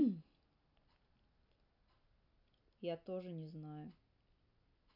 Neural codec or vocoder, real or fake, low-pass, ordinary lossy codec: none; real; 5.4 kHz; none